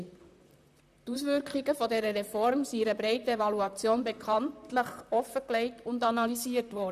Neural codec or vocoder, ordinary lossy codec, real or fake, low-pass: vocoder, 44.1 kHz, 128 mel bands, Pupu-Vocoder; none; fake; 14.4 kHz